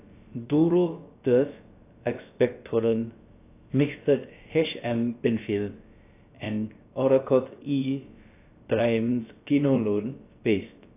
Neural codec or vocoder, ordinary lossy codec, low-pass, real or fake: codec, 16 kHz, about 1 kbps, DyCAST, with the encoder's durations; AAC, 24 kbps; 3.6 kHz; fake